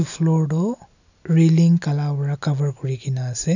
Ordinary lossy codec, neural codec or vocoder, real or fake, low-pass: none; none; real; 7.2 kHz